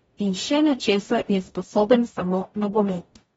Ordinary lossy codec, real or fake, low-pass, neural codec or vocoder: AAC, 24 kbps; fake; 19.8 kHz; codec, 44.1 kHz, 0.9 kbps, DAC